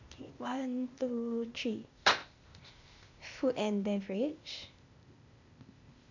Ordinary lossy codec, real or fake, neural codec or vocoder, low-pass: none; fake; codec, 16 kHz, 0.8 kbps, ZipCodec; 7.2 kHz